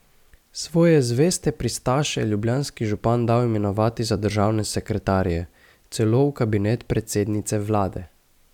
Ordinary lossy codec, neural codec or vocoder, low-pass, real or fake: none; none; 19.8 kHz; real